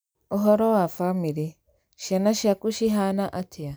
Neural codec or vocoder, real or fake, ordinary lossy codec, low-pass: none; real; none; none